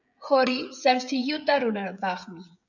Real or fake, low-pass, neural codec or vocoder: fake; 7.2 kHz; codec, 16 kHz, 16 kbps, FreqCodec, smaller model